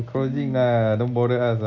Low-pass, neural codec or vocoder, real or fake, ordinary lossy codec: 7.2 kHz; none; real; none